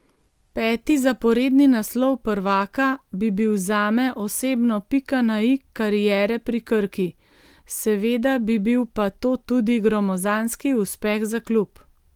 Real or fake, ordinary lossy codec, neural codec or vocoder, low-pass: real; Opus, 24 kbps; none; 19.8 kHz